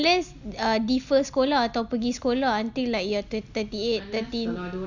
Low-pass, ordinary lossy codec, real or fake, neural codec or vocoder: 7.2 kHz; Opus, 64 kbps; real; none